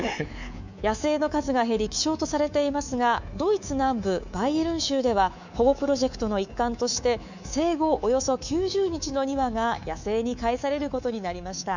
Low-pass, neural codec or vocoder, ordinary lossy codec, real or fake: 7.2 kHz; codec, 24 kHz, 3.1 kbps, DualCodec; none; fake